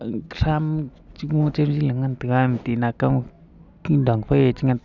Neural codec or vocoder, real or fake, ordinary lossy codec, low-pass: none; real; none; 7.2 kHz